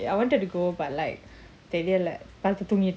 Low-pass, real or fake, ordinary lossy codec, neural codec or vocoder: none; real; none; none